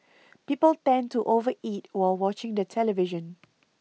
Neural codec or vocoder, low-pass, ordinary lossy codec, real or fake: none; none; none; real